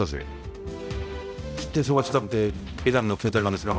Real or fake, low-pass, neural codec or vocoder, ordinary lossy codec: fake; none; codec, 16 kHz, 0.5 kbps, X-Codec, HuBERT features, trained on balanced general audio; none